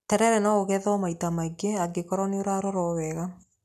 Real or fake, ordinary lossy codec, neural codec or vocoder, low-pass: real; none; none; 14.4 kHz